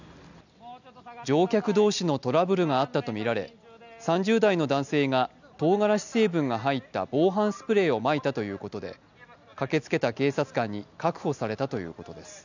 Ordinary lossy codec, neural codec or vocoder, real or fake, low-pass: none; none; real; 7.2 kHz